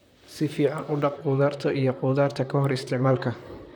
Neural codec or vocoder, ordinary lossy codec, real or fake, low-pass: vocoder, 44.1 kHz, 128 mel bands, Pupu-Vocoder; none; fake; none